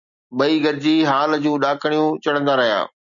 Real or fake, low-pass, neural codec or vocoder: real; 7.2 kHz; none